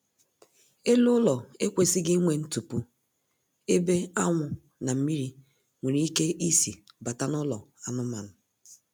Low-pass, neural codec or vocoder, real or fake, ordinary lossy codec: none; none; real; none